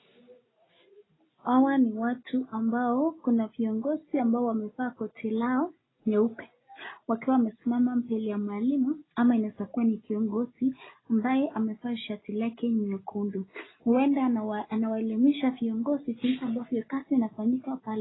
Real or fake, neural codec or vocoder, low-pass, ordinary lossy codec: real; none; 7.2 kHz; AAC, 16 kbps